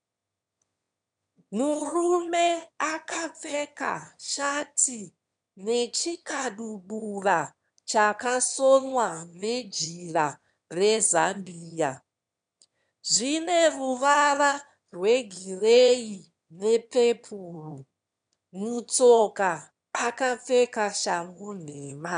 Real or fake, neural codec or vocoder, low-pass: fake; autoencoder, 22.05 kHz, a latent of 192 numbers a frame, VITS, trained on one speaker; 9.9 kHz